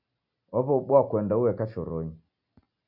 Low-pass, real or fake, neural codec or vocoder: 5.4 kHz; real; none